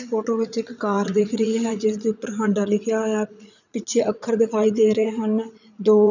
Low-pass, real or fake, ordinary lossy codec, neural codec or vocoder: 7.2 kHz; fake; none; vocoder, 44.1 kHz, 80 mel bands, Vocos